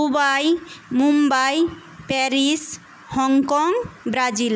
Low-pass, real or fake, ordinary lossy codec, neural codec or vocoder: none; real; none; none